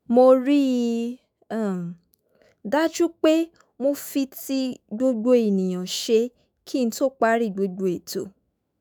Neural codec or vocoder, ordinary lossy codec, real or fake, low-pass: autoencoder, 48 kHz, 128 numbers a frame, DAC-VAE, trained on Japanese speech; none; fake; none